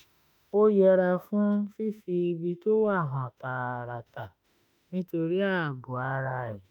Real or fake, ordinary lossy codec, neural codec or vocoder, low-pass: fake; none; autoencoder, 48 kHz, 32 numbers a frame, DAC-VAE, trained on Japanese speech; 19.8 kHz